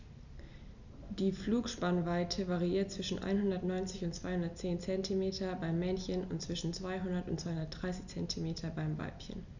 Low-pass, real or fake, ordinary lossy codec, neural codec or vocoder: 7.2 kHz; real; none; none